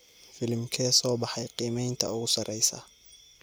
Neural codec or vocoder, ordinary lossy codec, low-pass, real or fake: none; none; none; real